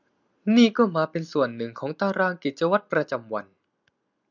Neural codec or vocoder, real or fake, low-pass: none; real; 7.2 kHz